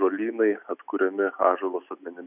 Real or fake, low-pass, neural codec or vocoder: real; 3.6 kHz; none